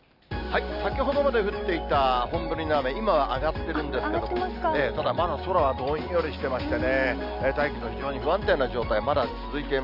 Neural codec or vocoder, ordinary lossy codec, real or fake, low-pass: none; none; real; 5.4 kHz